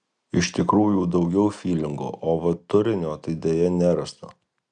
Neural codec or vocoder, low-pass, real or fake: none; 9.9 kHz; real